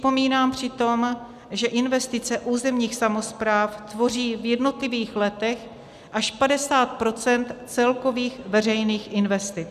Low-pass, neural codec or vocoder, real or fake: 14.4 kHz; none; real